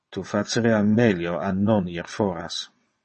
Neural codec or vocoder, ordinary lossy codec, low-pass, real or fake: vocoder, 22.05 kHz, 80 mel bands, WaveNeXt; MP3, 32 kbps; 9.9 kHz; fake